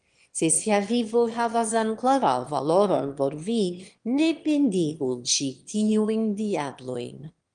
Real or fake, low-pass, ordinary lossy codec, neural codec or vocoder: fake; 9.9 kHz; Opus, 24 kbps; autoencoder, 22.05 kHz, a latent of 192 numbers a frame, VITS, trained on one speaker